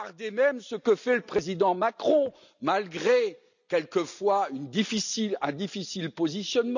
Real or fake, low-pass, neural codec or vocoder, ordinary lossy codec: real; 7.2 kHz; none; none